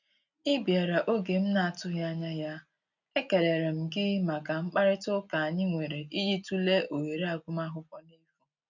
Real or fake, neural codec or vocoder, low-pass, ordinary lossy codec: real; none; 7.2 kHz; none